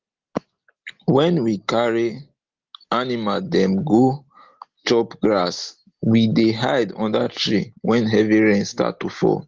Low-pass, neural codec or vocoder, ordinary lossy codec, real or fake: 7.2 kHz; none; Opus, 16 kbps; real